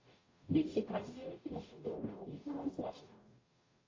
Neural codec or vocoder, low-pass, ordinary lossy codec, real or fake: codec, 44.1 kHz, 0.9 kbps, DAC; 7.2 kHz; AAC, 32 kbps; fake